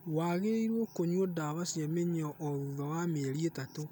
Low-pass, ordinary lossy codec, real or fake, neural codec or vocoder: none; none; real; none